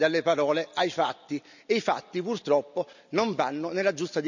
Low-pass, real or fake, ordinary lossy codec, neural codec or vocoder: 7.2 kHz; real; none; none